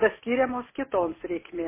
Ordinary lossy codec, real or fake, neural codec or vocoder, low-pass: MP3, 16 kbps; real; none; 3.6 kHz